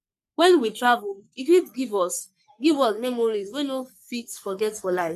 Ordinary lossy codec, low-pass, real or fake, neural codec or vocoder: none; 14.4 kHz; fake; codec, 44.1 kHz, 3.4 kbps, Pupu-Codec